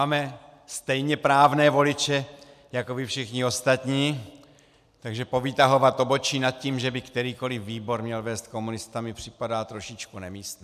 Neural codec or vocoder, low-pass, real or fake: none; 14.4 kHz; real